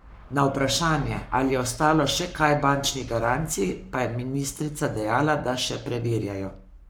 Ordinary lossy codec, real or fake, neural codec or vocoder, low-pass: none; fake; codec, 44.1 kHz, 7.8 kbps, Pupu-Codec; none